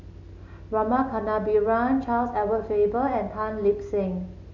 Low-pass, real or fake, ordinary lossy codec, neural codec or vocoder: 7.2 kHz; real; none; none